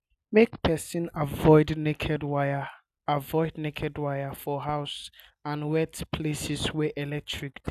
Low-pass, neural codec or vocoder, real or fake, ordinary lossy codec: 14.4 kHz; none; real; none